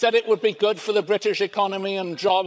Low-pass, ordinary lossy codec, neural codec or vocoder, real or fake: none; none; codec, 16 kHz, 16 kbps, FreqCodec, larger model; fake